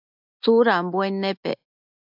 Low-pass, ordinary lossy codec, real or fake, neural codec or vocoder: 5.4 kHz; AAC, 48 kbps; real; none